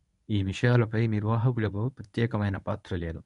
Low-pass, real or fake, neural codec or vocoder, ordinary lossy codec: 10.8 kHz; fake; codec, 24 kHz, 0.9 kbps, WavTokenizer, medium speech release version 1; none